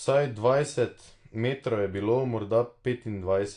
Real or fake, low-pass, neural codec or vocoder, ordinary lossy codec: real; 9.9 kHz; none; AAC, 48 kbps